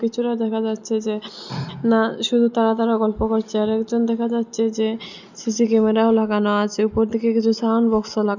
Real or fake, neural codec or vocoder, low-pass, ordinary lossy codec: real; none; 7.2 kHz; none